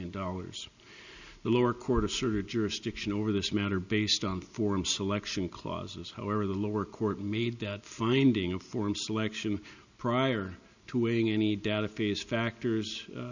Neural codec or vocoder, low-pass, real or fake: none; 7.2 kHz; real